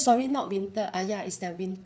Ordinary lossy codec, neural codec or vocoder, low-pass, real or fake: none; codec, 16 kHz, 4 kbps, FreqCodec, larger model; none; fake